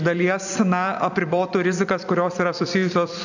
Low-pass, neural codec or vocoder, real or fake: 7.2 kHz; vocoder, 44.1 kHz, 128 mel bands every 256 samples, BigVGAN v2; fake